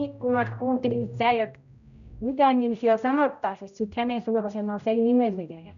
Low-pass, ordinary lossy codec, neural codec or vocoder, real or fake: 7.2 kHz; none; codec, 16 kHz, 0.5 kbps, X-Codec, HuBERT features, trained on general audio; fake